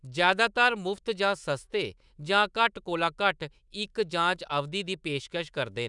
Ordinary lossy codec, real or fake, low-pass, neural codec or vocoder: none; fake; 10.8 kHz; autoencoder, 48 kHz, 128 numbers a frame, DAC-VAE, trained on Japanese speech